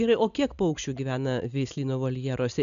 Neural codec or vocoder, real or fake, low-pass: none; real; 7.2 kHz